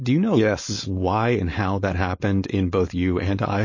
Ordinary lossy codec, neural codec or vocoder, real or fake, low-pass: MP3, 32 kbps; codec, 16 kHz, 4.8 kbps, FACodec; fake; 7.2 kHz